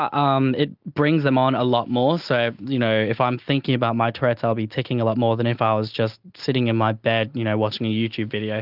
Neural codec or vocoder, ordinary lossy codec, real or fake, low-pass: none; Opus, 24 kbps; real; 5.4 kHz